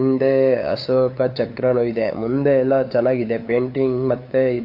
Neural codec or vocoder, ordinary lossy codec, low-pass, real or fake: codec, 16 kHz, 8 kbps, FunCodec, trained on LibriTTS, 25 frames a second; AAC, 32 kbps; 5.4 kHz; fake